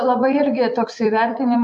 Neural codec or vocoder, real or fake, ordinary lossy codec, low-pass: vocoder, 44.1 kHz, 128 mel bands every 256 samples, BigVGAN v2; fake; AAC, 64 kbps; 10.8 kHz